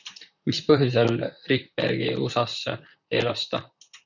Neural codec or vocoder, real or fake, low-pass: vocoder, 44.1 kHz, 128 mel bands, Pupu-Vocoder; fake; 7.2 kHz